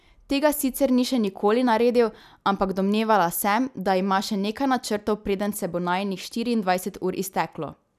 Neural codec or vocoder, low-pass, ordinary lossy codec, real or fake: none; 14.4 kHz; none; real